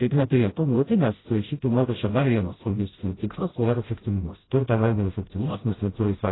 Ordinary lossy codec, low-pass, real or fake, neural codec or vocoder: AAC, 16 kbps; 7.2 kHz; fake; codec, 16 kHz, 0.5 kbps, FreqCodec, smaller model